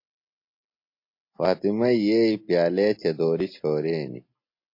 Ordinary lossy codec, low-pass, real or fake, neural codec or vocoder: MP3, 32 kbps; 5.4 kHz; real; none